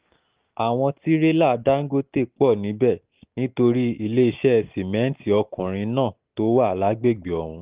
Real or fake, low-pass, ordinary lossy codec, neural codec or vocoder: real; 3.6 kHz; Opus, 24 kbps; none